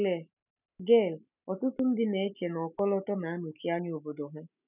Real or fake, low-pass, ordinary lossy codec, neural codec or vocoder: real; 3.6 kHz; none; none